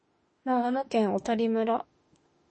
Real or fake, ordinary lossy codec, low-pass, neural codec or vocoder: fake; MP3, 32 kbps; 9.9 kHz; autoencoder, 48 kHz, 32 numbers a frame, DAC-VAE, trained on Japanese speech